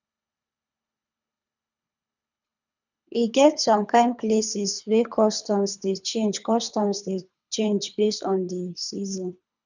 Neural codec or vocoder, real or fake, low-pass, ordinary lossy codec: codec, 24 kHz, 3 kbps, HILCodec; fake; 7.2 kHz; none